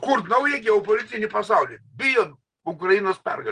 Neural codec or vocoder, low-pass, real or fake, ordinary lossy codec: none; 9.9 kHz; real; Opus, 24 kbps